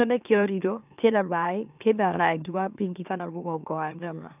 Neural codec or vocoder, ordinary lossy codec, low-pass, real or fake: autoencoder, 44.1 kHz, a latent of 192 numbers a frame, MeloTTS; none; 3.6 kHz; fake